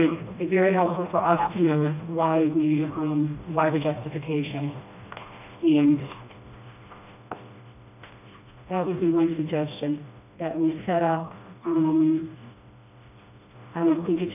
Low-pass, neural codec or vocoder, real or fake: 3.6 kHz; codec, 16 kHz, 1 kbps, FreqCodec, smaller model; fake